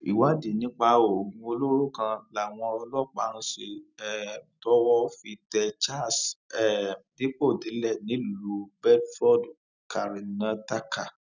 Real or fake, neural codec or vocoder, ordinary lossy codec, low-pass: real; none; none; 7.2 kHz